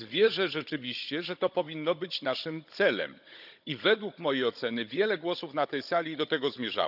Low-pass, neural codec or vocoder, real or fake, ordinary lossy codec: 5.4 kHz; codec, 16 kHz, 16 kbps, FunCodec, trained on Chinese and English, 50 frames a second; fake; none